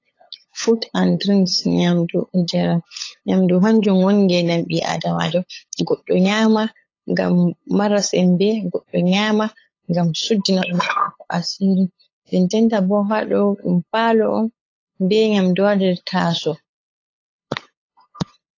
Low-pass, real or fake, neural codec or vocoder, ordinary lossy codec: 7.2 kHz; fake; codec, 16 kHz, 8 kbps, FunCodec, trained on LibriTTS, 25 frames a second; AAC, 32 kbps